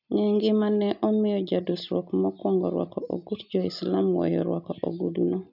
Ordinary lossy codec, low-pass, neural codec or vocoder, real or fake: none; 5.4 kHz; none; real